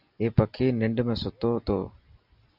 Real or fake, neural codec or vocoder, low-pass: real; none; 5.4 kHz